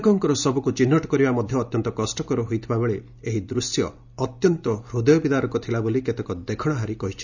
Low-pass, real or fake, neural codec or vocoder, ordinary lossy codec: 7.2 kHz; real; none; none